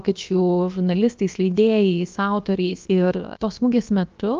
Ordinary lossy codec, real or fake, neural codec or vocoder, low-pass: Opus, 24 kbps; fake; codec, 16 kHz, about 1 kbps, DyCAST, with the encoder's durations; 7.2 kHz